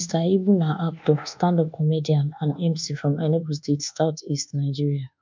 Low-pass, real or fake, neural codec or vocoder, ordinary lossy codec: 7.2 kHz; fake; codec, 24 kHz, 1.2 kbps, DualCodec; MP3, 64 kbps